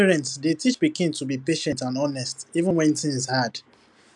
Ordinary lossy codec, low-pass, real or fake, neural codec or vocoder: none; 10.8 kHz; real; none